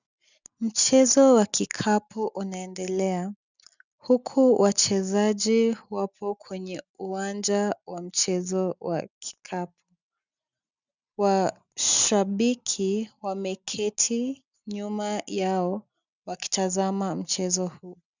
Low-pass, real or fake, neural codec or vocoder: 7.2 kHz; real; none